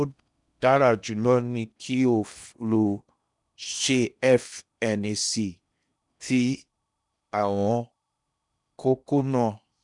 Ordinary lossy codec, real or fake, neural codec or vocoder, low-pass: none; fake; codec, 16 kHz in and 24 kHz out, 0.8 kbps, FocalCodec, streaming, 65536 codes; 10.8 kHz